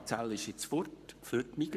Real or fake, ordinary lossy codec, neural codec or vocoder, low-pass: fake; none; codec, 44.1 kHz, 7.8 kbps, Pupu-Codec; 14.4 kHz